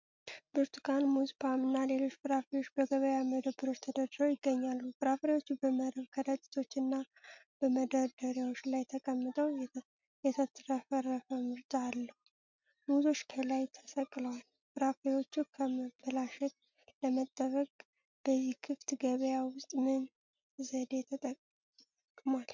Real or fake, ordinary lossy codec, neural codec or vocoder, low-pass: real; MP3, 64 kbps; none; 7.2 kHz